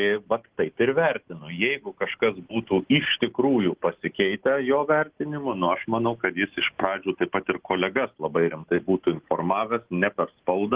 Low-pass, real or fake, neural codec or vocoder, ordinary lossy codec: 3.6 kHz; real; none; Opus, 16 kbps